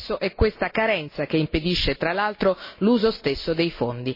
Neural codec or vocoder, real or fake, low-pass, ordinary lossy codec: none; real; 5.4 kHz; MP3, 24 kbps